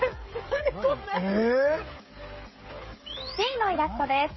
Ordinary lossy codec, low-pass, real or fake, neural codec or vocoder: MP3, 24 kbps; 7.2 kHz; fake; codec, 16 kHz, 16 kbps, FreqCodec, smaller model